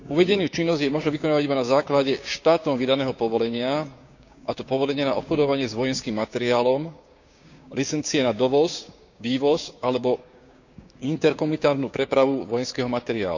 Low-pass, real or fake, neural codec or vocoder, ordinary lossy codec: 7.2 kHz; fake; codec, 16 kHz, 6 kbps, DAC; none